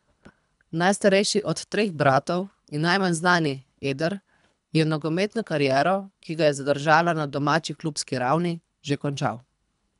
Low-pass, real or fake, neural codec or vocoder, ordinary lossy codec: 10.8 kHz; fake; codec, 24 kHz, 3 kbps, HILCodec; none